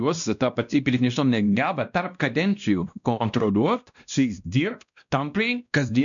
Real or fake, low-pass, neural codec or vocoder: fake; 7.2 kHz; codec, 16 kHz, 1 kbps, X-Codec, WavLM features, trained on Multilingual LibriSpeech